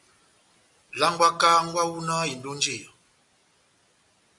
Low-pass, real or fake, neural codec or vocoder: 10.8 kHz; real; none